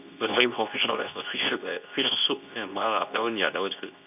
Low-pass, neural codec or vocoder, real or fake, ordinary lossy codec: 3.6 kHz; codec, 24 kHz, 0.9 kbps, WavTokenizer, medium speech release version 2; fake; none